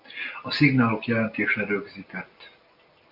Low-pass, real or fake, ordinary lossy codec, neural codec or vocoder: 5.4 kHz; real; MP3, 48 kbps; none